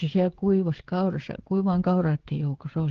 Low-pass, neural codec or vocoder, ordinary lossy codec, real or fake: 7.2 kHz; codec, 16 kHz, 16 kbps, FreqCodec, smaller model; Opus, 16 kbps; fake